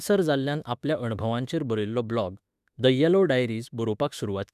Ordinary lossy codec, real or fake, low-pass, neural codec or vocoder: none; fake; 14.4 kHz; autoencoder, 48 kHz, 32 numbers a frame, DAC-VAE, trained on Japanese speech